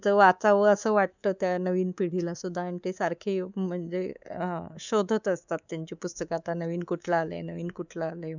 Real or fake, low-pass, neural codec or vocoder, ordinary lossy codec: fake; 7.2 kHz; codec, 24 kHz, 3.1 kbps, DualCodec; none